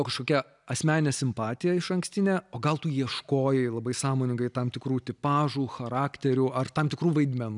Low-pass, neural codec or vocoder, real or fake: 10.8 kHz; none; real